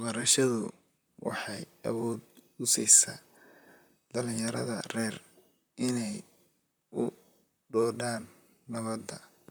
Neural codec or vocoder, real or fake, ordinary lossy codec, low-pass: vocoder, 44.1 kHz, 128 mel bands, Pupu-Vocoder; fake; none; none